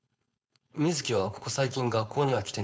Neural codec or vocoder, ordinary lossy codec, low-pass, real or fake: codec, 16 kHz, 4.8 kbps, FACodec; none; none; fake